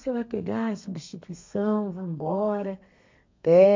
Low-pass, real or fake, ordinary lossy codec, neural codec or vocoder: 7.2 kHz; fake; MP3, 48 kbps; codec, 32 kHz, 1.9 kbps, SNAC